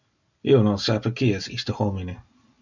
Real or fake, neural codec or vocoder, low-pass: real; none; 7.2 kHz